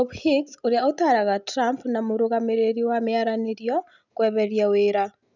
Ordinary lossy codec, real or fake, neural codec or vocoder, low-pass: none; real; none; 7.2 kHz